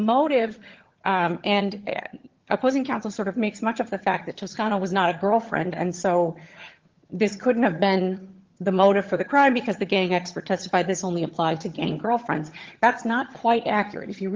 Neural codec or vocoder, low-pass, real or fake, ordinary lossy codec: vocoder, 22.05 kHz, 80 mel bands, HiFi-GAN; 7.2 kHz; fake; Opus, 16 kbps